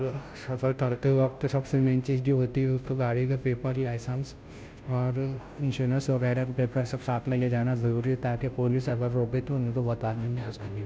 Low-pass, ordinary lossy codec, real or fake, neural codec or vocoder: none; none; fake; codec, 16 kHz, 0.5 kbps, FunCodec, trained on Chinese and English, 25 frames a second